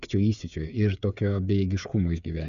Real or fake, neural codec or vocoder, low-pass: fake; codec, 16 kHz, 8 kbps, FreqCodec, smaller model; 7.2 kHz